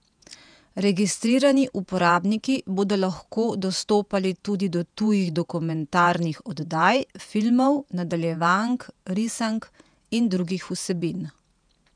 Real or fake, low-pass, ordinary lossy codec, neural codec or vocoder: fake; 9.9 kHz; none; vocoder, 48 kHz, 128 mel bands, Vocos